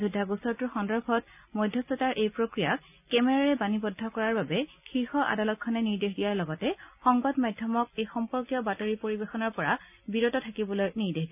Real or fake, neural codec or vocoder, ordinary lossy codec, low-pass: real; none; none; 3.6 kHz